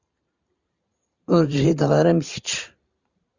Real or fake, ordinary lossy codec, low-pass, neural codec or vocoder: fake; Opus, 64 kbps; 7.2 kHz; vocoder, 44.1 kHz, 128 mel bands every 512 samples, BigVGAN v2